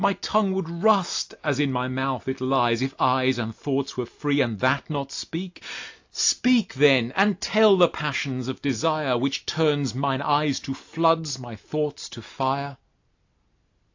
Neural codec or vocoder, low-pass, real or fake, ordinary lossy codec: none; 7.2 kHz; real; AAC, 48 kbps